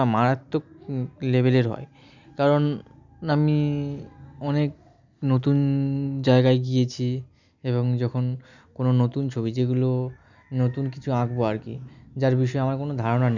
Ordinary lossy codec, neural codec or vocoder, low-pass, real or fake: none; none; 7.2 kHz; real